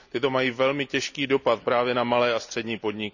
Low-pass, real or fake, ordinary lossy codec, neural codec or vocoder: 7.2 kHz; real; none; none